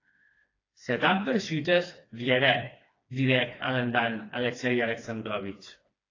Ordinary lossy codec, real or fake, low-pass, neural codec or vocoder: AAC, 32 kbps; fake; 7.2 kHz; codec, 16 kHz, 2 kbps, FreqCodec, smaller model